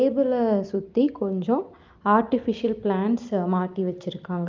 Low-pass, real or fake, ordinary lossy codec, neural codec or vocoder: 7.2 kHz; real; Opus, 24 kbps; none